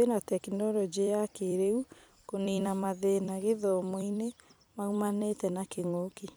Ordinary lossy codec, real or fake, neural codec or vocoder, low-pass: none; fake; vocoder, 44.1 kHz, 128 mel bands every 256 samples, BigVGAN v2; none